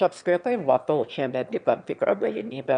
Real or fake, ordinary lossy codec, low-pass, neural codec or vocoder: fake; MP3, 96 kbps; 9.9 kHz; autoencoder, 22.05 kHz, a latent of 192 numbers a frame, VITS, trained on one speaker